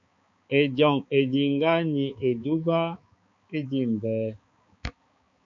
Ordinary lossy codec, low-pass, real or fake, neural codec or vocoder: MP3, 48 kbps; 7.2 kHz; fake; codec, 16 kHz, 4 kbps, X-Codec, HuBERT features, trained on balanced general audio